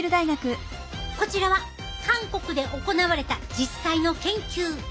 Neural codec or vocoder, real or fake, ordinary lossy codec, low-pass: none; real; none; none